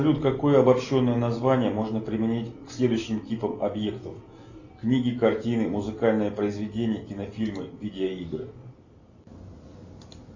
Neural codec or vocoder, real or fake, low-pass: none; real; 7.2 kHz